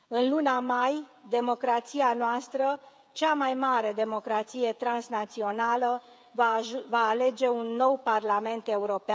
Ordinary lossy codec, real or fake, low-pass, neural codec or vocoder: none; fake; none; codec, 16 kHz, 16 kbps, FreqCodec, smaller model